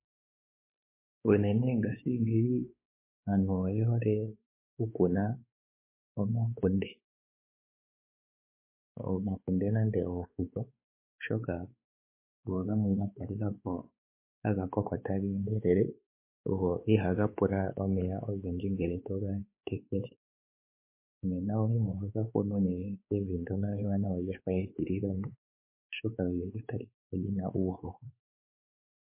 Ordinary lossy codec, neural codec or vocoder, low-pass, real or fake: MP3, 24 kbps; codec, 16 kHz, 4 kbps, X-Codec, WavLM features, trained on Multilingual LibriSpeech; 3.6 kHz; fake